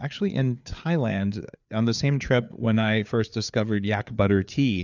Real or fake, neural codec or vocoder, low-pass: fake; codec, 16 kHz, 4 kbps, FreqCodec, larger model; 7.2 kHz